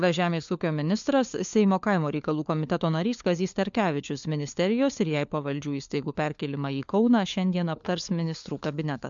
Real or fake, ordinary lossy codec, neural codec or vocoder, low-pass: fake; MP3, 64 kbps; codec, 16 kHz, 4 kbps, FunCodec, trained on LibriTTS, 50 frames a second; 7.2 kHz